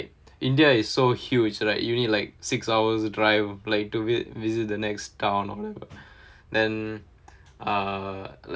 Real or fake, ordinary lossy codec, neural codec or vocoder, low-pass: real; none; none; none